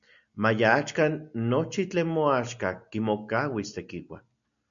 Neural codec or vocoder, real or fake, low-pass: none; real; 7.2 kHz